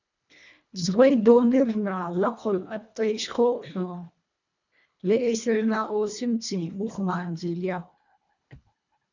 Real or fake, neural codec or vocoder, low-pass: fake; codec, 24 kHz, 1.5 kbps, HILCodec; 7.2 kHz